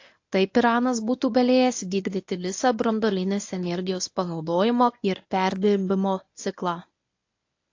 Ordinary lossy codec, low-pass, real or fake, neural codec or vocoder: AAC, 48 kbps; 7.2 kHz; fake; codec, 24 kHz, 0.9 kbps, WavTokenizer, medium speech release version 1